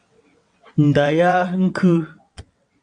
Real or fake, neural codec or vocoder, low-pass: fake; vocoder, 22.05 kHz, 80 mel bands, WaveNeXt; 9.9 kHz